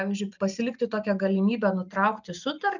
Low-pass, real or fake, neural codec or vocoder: 7.2 kHz; real; none